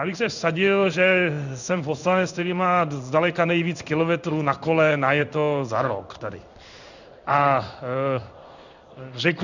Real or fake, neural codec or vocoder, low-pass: fake; codec, 16 kHz in and 24 kHz out, 1 kbps, XY-Tokenizer; 7.2 kHz